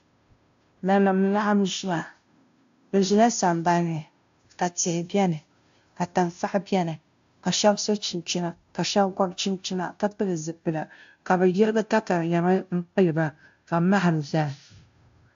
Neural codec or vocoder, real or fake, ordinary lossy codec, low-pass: codec, 16 kHz, 0.5 kbps, FunCodec, trained on Chinese and English, 25 frames a second; fake; AAC, 64 kbps; 7.2 kHz